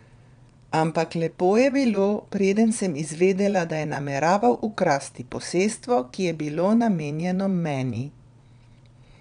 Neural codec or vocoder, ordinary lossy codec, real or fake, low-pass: vocoder, 22.05 kHz, 80 mel bands, Vocos; none; fake; 9.9 kHz